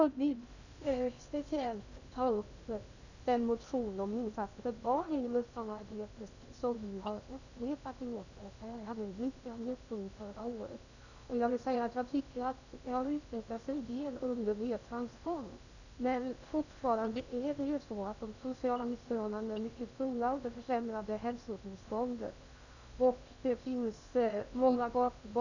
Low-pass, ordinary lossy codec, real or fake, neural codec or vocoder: 7.2 kHz; none; fake; codec, 16 kHz in and 24 kHz out, 0.6 kbps, FocalCodec, streaming, 2048 codes